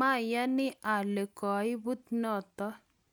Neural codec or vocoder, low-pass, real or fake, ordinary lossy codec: none; none; real; none